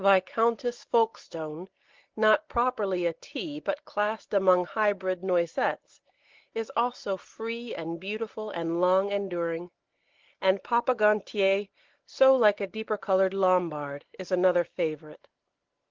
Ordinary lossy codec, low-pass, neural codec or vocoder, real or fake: Opus, 16 kbps; 7.2 kHz; none; real